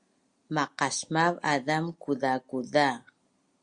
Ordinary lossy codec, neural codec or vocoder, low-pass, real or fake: Opus, 64 kbps; none; 9.9 kHz; real